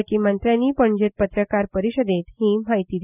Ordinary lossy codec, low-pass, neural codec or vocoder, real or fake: none; 3.6 kHz; none; real